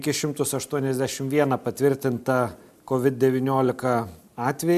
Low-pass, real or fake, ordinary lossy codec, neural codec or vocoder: 14.4 kHz; real; MP3, 96 kbps; none